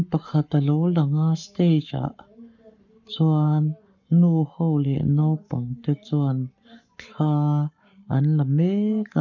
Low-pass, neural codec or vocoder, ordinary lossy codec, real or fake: 7.2 kHz; codec, 44.1 kHz, 7.8 kbps, Pupu-Codec; none; fake